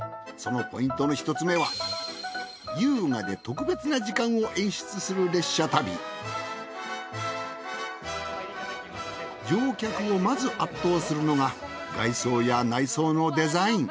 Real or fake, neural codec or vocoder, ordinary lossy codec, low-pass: real; none; none; none